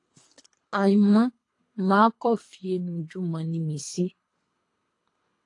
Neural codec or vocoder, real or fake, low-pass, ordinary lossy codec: codec, 24 kHz, 3 kbps, HILCodec; fake; 10.8 kHz; AAC, 48 kbps